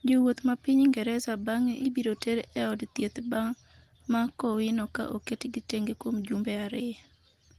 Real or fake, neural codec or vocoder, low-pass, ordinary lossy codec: real; none; 14.4 kHz; Opus, 24 kbps